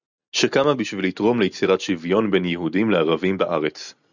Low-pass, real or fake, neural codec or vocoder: 7.2 kHz; real; none